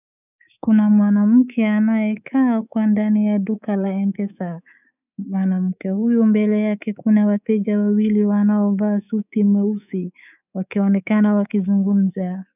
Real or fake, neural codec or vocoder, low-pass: fake; codec, 24 kHz, 3.1 kbps, DualCodec; 3.6 kHz